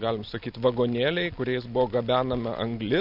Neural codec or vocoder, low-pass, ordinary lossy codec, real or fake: none; 5.4 kHz; MP3, 48 kbps; real